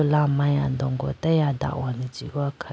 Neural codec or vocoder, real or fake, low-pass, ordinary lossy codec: none; real; none; none